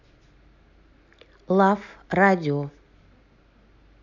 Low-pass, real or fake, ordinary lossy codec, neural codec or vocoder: 7.2 kHz; real; none; none